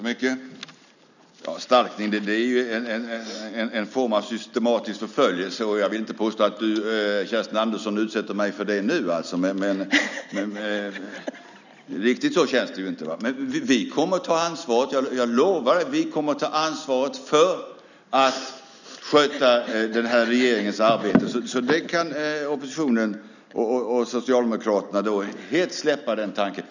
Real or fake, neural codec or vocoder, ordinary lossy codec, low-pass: real; none; none; 7.2 kHz